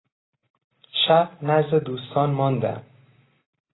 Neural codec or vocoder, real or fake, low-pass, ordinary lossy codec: none; real; 7.2 kHz; AAC, 16 kbps